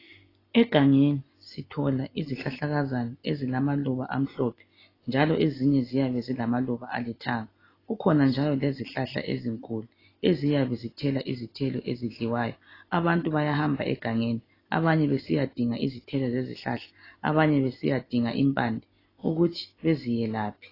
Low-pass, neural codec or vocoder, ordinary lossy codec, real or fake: 5.4 kHz; none; AAC, 24 kbps; real